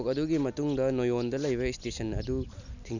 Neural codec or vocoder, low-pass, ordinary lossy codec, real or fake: none; 7.2 kHz; none; real